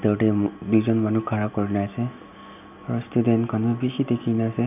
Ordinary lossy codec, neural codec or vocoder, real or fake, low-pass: none; none; real; 3.6 kHz